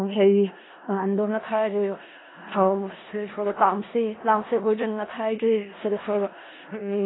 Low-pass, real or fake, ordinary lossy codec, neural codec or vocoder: 7.2 kHz; fake; AAC, 16 kbps; codec, 16 kHz in and 24 kHz out, 0.4 kbps, LongCat-Audio-Codec, four codebook decoder